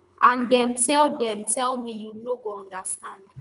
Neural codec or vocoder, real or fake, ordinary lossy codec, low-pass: codec, 24 kHz, 3 kbps, HILCodec; fake; none; 10.8 kHz